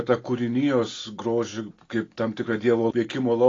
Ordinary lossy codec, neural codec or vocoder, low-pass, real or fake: AAC, 32 kbps; none; 7.2 kHz; real